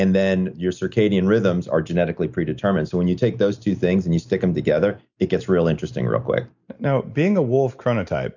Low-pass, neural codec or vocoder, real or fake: 7.2 kHz; none; real